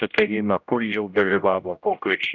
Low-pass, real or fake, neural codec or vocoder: 7.2 kHz; fake; codec, 16 kHz, 0.5 kbps, X-Codec, HuBERT features, trained on general audio